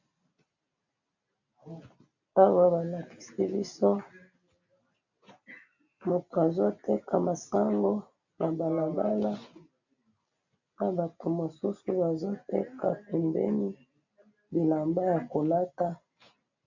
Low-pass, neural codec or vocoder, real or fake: 7.2 kHz; none; real